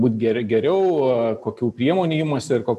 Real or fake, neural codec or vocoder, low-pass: real; none; 14.4 kHz